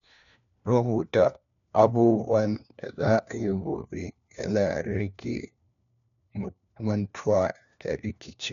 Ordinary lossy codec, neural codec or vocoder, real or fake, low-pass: none; codec, 16 kHz, 1 kbps, FunCodec, trained on LibriTTS, 50 frames a second; fake; 7.2 kHz